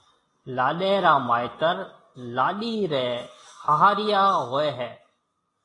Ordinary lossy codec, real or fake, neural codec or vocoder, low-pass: AAC, 32 kbps; real; none; 10.8 kHz